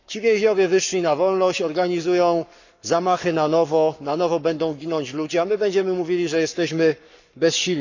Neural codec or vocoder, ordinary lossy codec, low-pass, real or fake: codec, 44.1 kHz, 7.8 kbps, Pupu-Codec; none; 7.2 kHz; fake